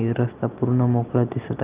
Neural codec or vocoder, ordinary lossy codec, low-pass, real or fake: none; Opus, 32 kbps; 3.6 kHz; real